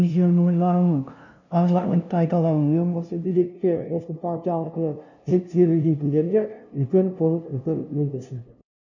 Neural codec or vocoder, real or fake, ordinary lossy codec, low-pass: codec, 16 kHz, 0.5 kbps, FunCodec, trained on LibriTTS, 25 frames a second; fake; none; 7.2 kHz